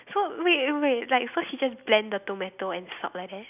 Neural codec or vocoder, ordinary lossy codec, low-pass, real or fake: none; none; 3.6 kHz; real